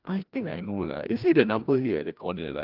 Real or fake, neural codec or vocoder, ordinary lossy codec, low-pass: fake; codec, 16 kHz, 1 kbps, FreqCodec, larger model; Opus, 32 kbps; 5.4 kHz